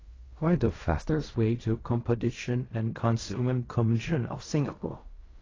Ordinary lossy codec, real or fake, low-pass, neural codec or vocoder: AAC, 32 kbps; fake; 7.2 kHz; codec, 16 kHz in and 24 kHz out, 0.4 kbps, LongCat-Audio-Codec, fine tuned four codebook decoder